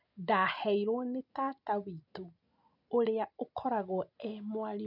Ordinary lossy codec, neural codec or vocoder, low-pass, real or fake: none; none; 5.4 kHz; real